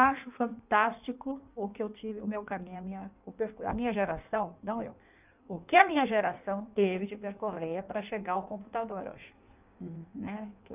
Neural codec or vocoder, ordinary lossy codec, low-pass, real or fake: codec, 16 kHz in and 24 kHz out, 1.1 kbps, FireRedTTS-2 codec; none; 3.6 kHz; fake